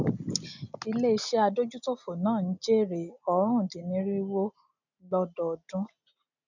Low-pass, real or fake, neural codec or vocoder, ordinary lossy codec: 7.2 kHz; real; none; none